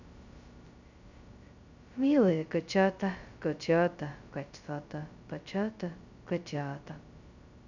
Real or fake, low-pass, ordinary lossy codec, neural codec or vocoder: fake; 7.2 kHz; none; codec, 16 kHz, 0.2 kbps, FocalCodec